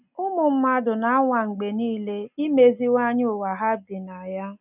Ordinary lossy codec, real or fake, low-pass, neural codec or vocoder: none; real; 3.6 kHz; none